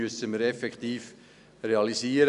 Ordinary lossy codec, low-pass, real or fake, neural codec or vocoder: none; 10.8 kHz; real; none